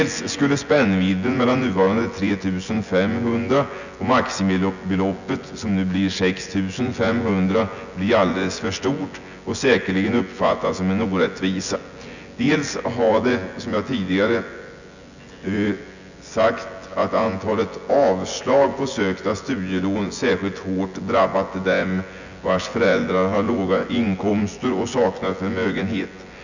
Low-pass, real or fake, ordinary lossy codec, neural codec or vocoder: 7.2 kHz; fake; none; vocoder, 24 kHz, 100 mel bands, Vocos